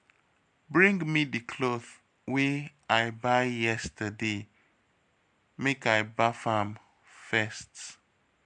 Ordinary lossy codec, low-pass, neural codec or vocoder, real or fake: MP3, 64 kbps; 9.9 kHz; none; real